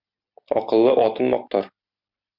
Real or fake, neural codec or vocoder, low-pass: real; none; 5.4 kHz